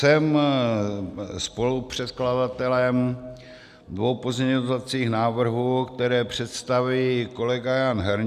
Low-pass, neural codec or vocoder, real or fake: 14.4 kHz; none; real